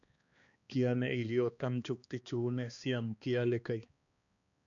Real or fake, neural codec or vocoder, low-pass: fake; codec, 16 kHz, 2 kbps, X-Codec, HuBERT features, trained on balanced general audio; 7.2 kHz